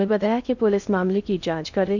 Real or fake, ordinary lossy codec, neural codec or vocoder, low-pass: fake; none; codec, 16 kHz in and 24 kHz out, 0.6 kbps, FocalCodec, streaming, 2048 codes; 7.2 kHz